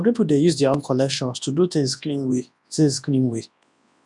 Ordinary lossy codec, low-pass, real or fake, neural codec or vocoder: none; 10.8 kHz; fake; codec, 24 kHz, 0.9 kbps, WavTokenizer, large speech release